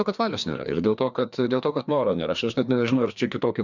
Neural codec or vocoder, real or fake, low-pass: codec, 16 kHz, 2 kbps, FreqCodec, larger model; fake; 7.2 kHz